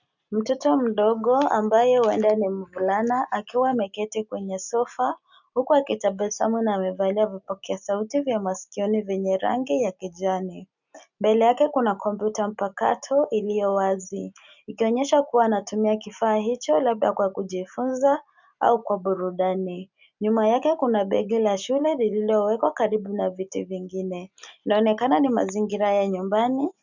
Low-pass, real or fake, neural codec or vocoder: 7.2 kHz; real; none